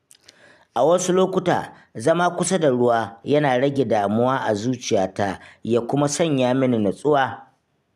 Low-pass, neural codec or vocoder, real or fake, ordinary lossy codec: 14.4 kHz; none; real; none